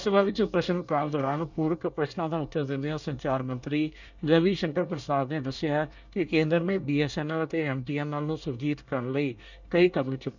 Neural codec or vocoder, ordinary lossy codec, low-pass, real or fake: codec, 24 kHz, 1 kbps, SNAC; none; 7.2 kHz; fake